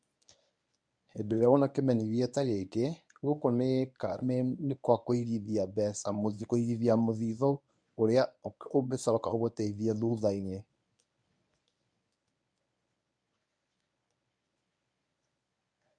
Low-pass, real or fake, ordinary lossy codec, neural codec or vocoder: 9.9 kHz; fake; none; codec, 24 kHz, 0.9 kbps, WavTokenizer, medium speech release version 1